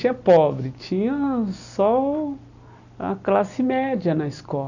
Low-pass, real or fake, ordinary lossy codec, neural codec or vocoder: 7.2 kHz; real; none; none